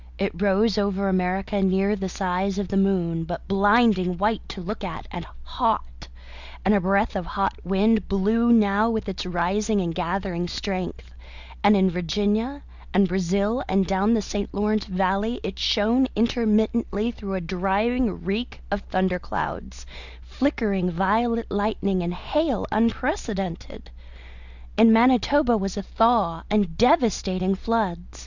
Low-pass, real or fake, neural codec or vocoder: 7.2 kHz; real; none